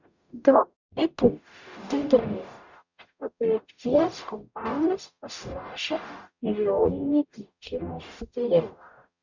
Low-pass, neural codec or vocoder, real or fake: 7.2 kHz; codec, 44.1 kHz, 0.9 kbps, DAC; fake